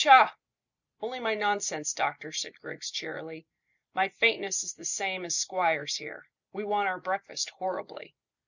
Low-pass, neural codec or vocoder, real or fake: 7.2 kHz; none; real